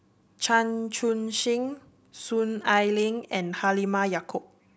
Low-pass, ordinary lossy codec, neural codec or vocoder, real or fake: none; none; none; real